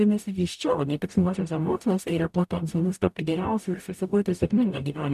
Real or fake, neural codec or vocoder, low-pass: fake; codec, 44.1 kHz, 0.9 kbps, DAC; 14.4 kHz